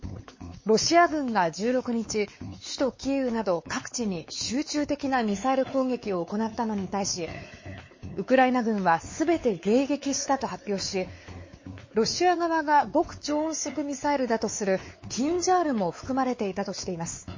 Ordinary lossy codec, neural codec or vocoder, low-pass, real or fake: MP3, 32 kbps; codec, 16 kHz, 4 kbps, X-Codec, WavLM features, trained on Multilingual LibriSpeech; 7.2 kHz; fake